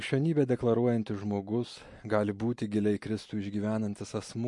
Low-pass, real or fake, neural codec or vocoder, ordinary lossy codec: 10.8 kHz; real; none; MP3, 48 kbps